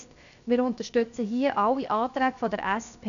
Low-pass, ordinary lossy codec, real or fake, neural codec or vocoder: 7.2 kHz; none; fake; codec, 16 kHz, 0.7 kbps, FocalCodec